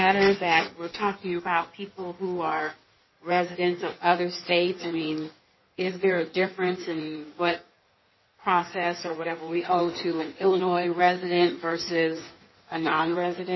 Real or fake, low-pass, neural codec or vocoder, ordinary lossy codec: fake; 7.2 kHz; codec, 16 kHz in and 24 kHz out, 1.1 kbps, FireRedTTS-2 codec; MP3, 24 kbps